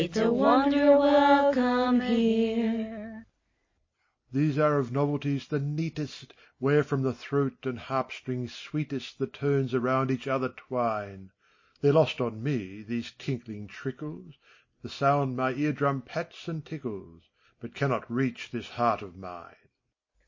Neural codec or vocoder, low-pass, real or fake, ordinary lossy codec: none; 7.2 kHz; real; MP3, 32 kbps